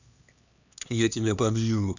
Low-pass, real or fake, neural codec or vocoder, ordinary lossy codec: 7.2 kHz; fake; codec, 16 kHz, 2 kbps, X-Codec, HuBERT features, trained on LibriSpeech; none